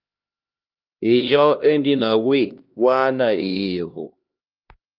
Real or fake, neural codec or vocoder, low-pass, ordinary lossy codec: fake; codec, 16 kHz, 1 kbps, X-Codec, HuBERT features, trained on LibriSpeech; 5.4 kHz; Opus, 24 kbps